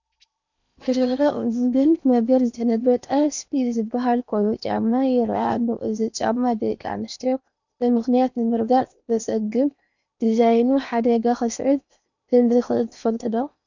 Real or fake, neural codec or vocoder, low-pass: fake; codec, 16 kHz in and 24 kHz out, 0.8 kbps, FocalCodec, streaming, 65536 codes; 7.2 kHz